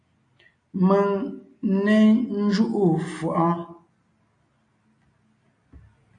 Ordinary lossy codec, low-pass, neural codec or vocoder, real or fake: AAC, 48 kbps; 9.9 kHz; none; real